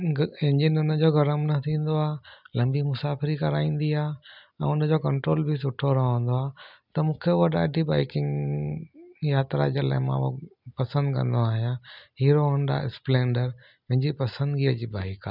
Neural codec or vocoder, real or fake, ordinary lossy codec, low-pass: none; real; none; 5.4 kHz